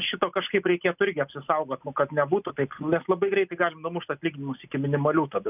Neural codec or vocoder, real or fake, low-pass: none; real; 3.6 kHz